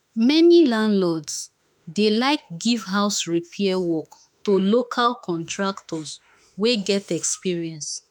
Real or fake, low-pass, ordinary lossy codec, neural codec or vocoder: fake; 19.8 kHz; none; autoencoder, 48 kHz, 32 numbers a frame, DAC-VAE, trained on Japanese speech